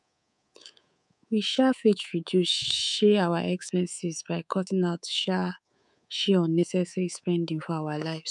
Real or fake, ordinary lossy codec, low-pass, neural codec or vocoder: fake; none; 10.8 kHz; codec, 24 kHz, 3.1 kbps, DualCodec